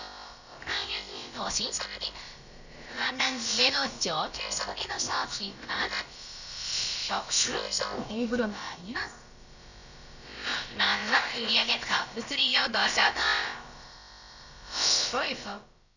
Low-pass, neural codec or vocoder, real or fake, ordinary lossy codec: 7.2 kHz; codec, 16 kHz, about 1 kbps, DyCAST, with the encoder's durations; fake; none